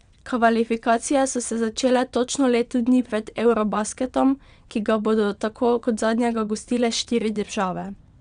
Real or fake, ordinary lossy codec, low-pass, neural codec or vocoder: fake; none; 9.9 kHz; vocoder, 22.05 kHz, 80 mel bands, WaveNeXt